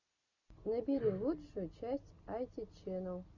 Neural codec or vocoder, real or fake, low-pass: none; real; 7.2 kHz